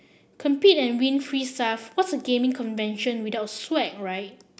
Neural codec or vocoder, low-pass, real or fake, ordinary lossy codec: none; none; real; none